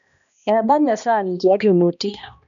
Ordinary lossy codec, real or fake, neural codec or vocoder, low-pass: none; fake; codec, 16 kHz, 1 kbps, X-Codec, HuBERT features, trained on balanced general audio; 7.2 kHz